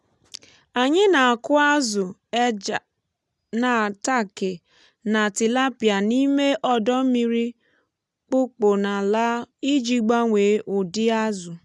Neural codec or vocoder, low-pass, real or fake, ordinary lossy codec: none; none; real; none